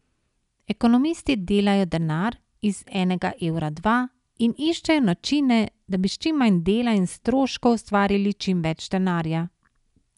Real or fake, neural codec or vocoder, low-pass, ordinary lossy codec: real; none; 10.8 kHz; none